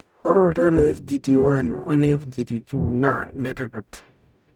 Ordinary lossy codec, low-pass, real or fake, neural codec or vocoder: none; 19.8 kHz; fake; codec, 44.1 kHz, 0.9 kbps, DAC